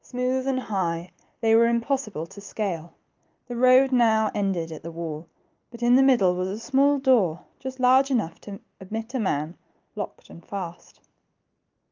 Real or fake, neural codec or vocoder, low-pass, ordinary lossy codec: real; none; 7.2 kHz; Opus, 24 kbps